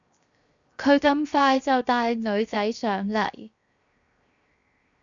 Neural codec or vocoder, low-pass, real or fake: codec, 16 kHz, 0.7 kbps, FocalCodec; 7.2 kHz; fake